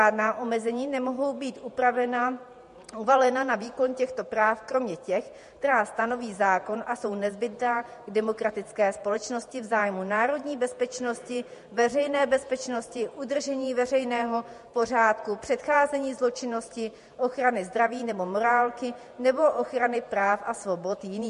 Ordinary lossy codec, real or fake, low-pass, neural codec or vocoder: MP3, 48 kbps; fake; 14.4 kHz; vocoder, 48 kHz, 128 mel bands, Vocos